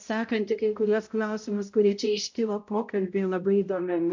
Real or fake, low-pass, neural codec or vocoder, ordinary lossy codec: fake; 7.2 kHz; codec, 16 kHz, 0.5 kbps, X-Codec, HuBERT features, trained on balanced general audio; MP3, 48 kbps